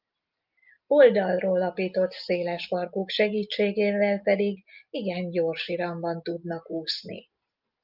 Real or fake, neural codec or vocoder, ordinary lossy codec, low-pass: real; none; Opus, 32 kbps; 5.4 kHz